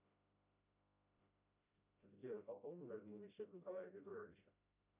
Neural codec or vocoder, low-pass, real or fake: codec, 16 kHz, 0.5 kbps, FreqCodec, smaller model; 3.6 kHz; fake